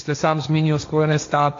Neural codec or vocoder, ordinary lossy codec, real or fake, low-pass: codec, 16 kHz, 1.1 kbps, Voila-Tokenizer; AAC, 48 kbps; fake; 7.2 kHz